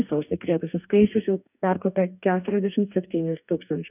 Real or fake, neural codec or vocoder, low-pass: fake; codec, 44.1 kHz, 2.6 kbps, DAC; 3.6 kHz